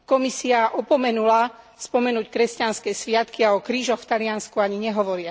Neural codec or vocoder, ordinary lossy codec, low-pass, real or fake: none; none; none; real